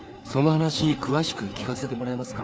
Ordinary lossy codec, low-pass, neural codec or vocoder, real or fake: none; none; codec, 16 kHz, 4 kbps, FreqCodec, larger model; fake